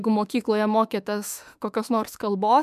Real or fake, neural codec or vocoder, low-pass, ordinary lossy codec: fake; autoencoder, 48 kHz, 32 numbers a frame, DAC-VAE, trained on Japanese speech; 14.4 kHz; MP3, 96 kbps